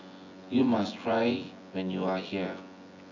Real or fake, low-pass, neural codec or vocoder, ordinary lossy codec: fake; 7.2 kHz; vocoder, 24 kHz, 100 mel bands, Vocos; AAC, 48 kbps